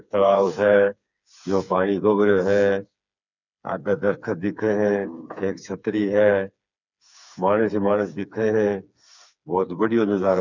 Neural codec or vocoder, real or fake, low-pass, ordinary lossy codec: codec, 16 kHz, 4 kbps, FreqCodec, smaller model; fake; 7.2 kHz; none